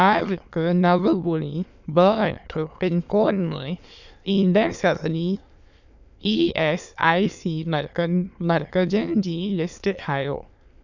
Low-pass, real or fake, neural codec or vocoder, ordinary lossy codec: 7.2 kHz; fake; autoencoder, 22.05 kHz, a latent of 192 numbers a frame, VITS, trained on many speakers; none